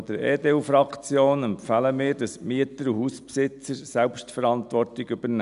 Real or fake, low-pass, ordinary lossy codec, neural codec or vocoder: real; 10.8 kHz; none; none